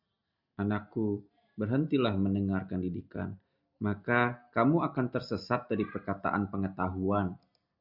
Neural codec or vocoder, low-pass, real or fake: none; 5.4 kHz; real